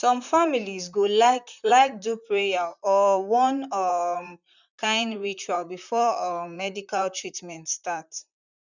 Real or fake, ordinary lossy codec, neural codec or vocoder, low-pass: fake; none; vocoder, 44.1 kHz, 128 mel bands, Pupu-Vocoder; 7.2 kHz